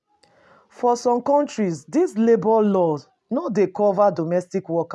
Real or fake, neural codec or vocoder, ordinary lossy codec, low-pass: real; none; none; none